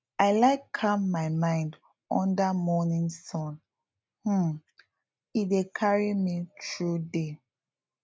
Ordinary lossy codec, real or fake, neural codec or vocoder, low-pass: none; real; none; none